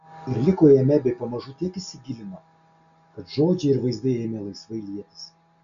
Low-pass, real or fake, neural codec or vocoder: 7.2 kHz; real; none